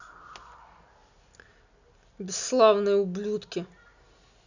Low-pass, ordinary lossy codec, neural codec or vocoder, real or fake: 7.2 kHz; none; none; real